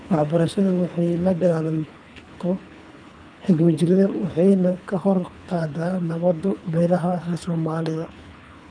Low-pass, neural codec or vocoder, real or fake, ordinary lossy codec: 9.9 kHz; codec, 24 kHz, 3 kbps, HILCodec; fake; none